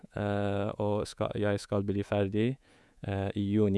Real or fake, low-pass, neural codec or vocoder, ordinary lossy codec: fake; none; codec, 24 kHz, 3.1 kbps, DualCodec; none